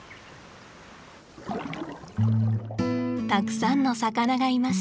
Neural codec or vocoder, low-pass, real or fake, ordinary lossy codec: none; none; real; none